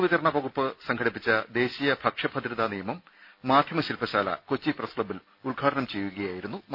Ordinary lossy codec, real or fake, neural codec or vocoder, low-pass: none; real; none; 5.4 kHz